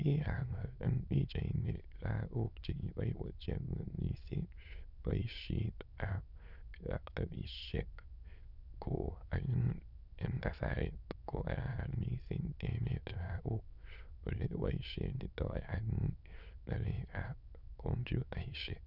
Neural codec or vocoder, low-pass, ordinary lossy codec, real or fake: autoencoder, 22.05 kHz, a latent of 192 numbers a frame, VITS, trained on many speakers; 5.4 kHz; none; fake